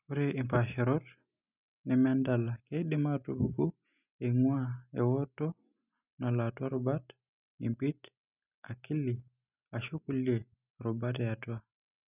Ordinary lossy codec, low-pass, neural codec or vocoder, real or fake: none; 3.6 kHz; none; real